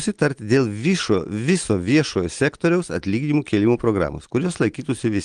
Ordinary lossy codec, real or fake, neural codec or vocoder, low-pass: Opus, 24 kbps; real; none; 9.9 kHz